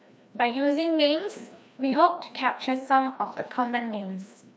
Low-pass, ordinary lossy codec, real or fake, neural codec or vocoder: none; none; fake; codec, 16 kHz, 1 kbps, FreqCodec, larger model